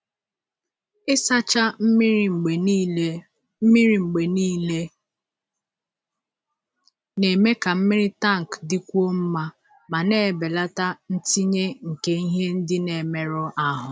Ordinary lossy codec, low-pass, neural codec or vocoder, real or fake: none; none; none; real